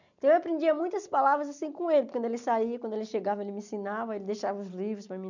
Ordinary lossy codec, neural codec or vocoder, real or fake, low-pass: none; none; real; 7.2 kHz